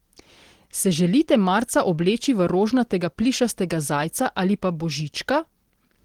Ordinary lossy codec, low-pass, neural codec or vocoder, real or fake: Opus, 16 kbps; 19.8 kHz; none; real